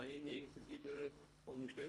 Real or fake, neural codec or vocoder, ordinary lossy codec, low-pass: fake; codec, 24 kHz, 1.5 kbps, HILCodec; none; none